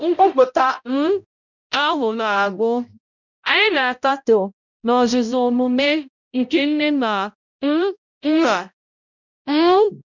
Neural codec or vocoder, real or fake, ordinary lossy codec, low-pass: codec, 16 kHz, 0.5 kbps, X-Codec, HuBERT features, trained on balanced general audio; fake; none; 7.2 kHz